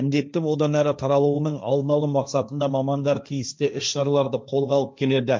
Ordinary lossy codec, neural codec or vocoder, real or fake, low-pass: none; codec, 16 kHz, 1.1 kbps, Voila-Tokenizer; fake; none